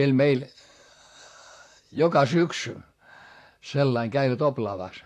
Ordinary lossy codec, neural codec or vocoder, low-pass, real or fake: AAC, 64 kbps; vocoder, 44.1 kHz, 128 mel bands, Pupu-Vocoder; 14.4 kHz; fake